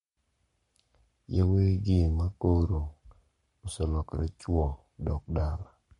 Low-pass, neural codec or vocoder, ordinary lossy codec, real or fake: 19.8 kHz; codec, 44.1 kHz, 7.8 kbps, Pupu-Codec; MP3, 48 kbps; fake